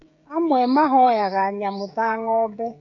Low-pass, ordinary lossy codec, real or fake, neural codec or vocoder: 7.2 kHz; AAC, 48 kbps; fake; codec, 16 kHz, 16 kbps, FreqCodec, smaller model